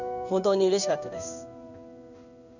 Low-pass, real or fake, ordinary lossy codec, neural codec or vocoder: 7.2 kHz; fake; none; codec, 16 kHz in and 24 kHz out, 1 kbps, XY-Tokenizer